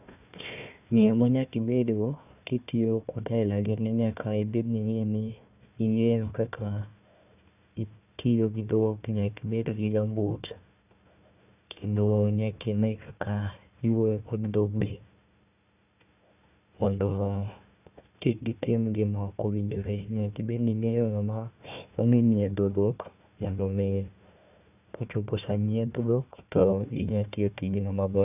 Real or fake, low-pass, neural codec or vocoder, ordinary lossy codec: fake; 3.6 kHz; codec, 16 kHz, 1 kbps, FunCodec, trained on Chinese and English, 50 frames a second; none